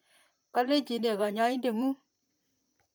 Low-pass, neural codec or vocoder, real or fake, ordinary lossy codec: none; vocoder, 44.1 kHz, 128 mel bands, Pupu-Vocoder; fake; none